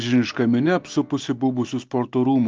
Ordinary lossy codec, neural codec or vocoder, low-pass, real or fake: Opus, 24 kbps; none; 7.2 kHz; real